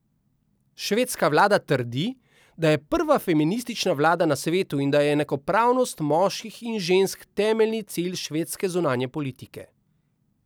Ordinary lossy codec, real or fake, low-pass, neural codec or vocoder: none; real; none; none